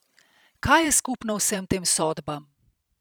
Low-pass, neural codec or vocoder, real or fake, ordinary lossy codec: none; vocoder, 44.1 kHz, 128 mel bands every 512 samples, BigVGAN v2; fake; none